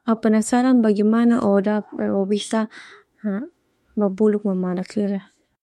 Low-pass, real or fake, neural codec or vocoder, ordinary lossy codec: 19.8 kHz; fake; autoencoder, 48 kHz, 32 numbers a frame, DAC-VAE, trained on Japanese speech; MP3, 64 kbps